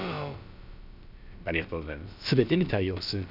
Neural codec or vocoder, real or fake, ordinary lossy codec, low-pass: codec, 16 kHz, about 1 kbps, DyCAST, with the encoder's durations; fake; none; 5.4 kHz